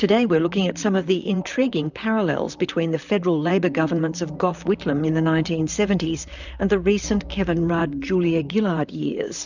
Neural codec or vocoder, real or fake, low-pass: vocoder, 44.1 kHz, 128 mel bands, Pupu-Vocoder; fake; 7.2 kHz